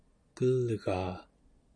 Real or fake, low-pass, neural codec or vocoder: real; 9.9 kHz; none